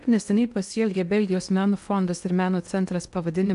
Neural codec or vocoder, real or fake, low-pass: codec, 16 kHz in and 24 kHz out, 0.8 kbps, FocalCodec, streaming, 65536 codes; fake; 10.8 kHz